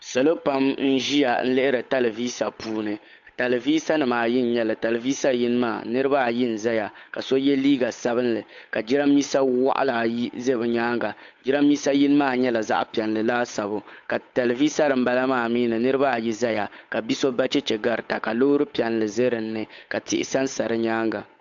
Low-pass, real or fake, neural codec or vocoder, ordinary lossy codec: 7.2 kHz; fake; codec, 16 kHz, 8 kbps, FunCodec, trained on Chinese and English, 25 frames a second; AAC, 64 kbps